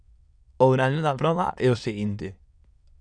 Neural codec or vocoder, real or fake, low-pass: autoencoder, 22.05 kHz, a latent of 192 numbers a frame, VITS, trained on many speakers; fake; 9.9 kHz